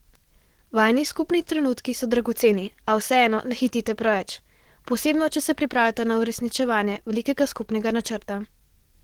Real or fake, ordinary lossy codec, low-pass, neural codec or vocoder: fake; Opus, 16 kbps; 19.8 kHz; codec, 44.1 kHz, 7.8 kbps, DAC